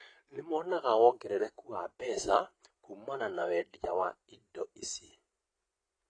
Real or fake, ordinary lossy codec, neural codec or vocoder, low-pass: fake; AAC, 32 kbps; vocoder, 24 kHz, 100 mel bands, Vocos; 9.9 kHz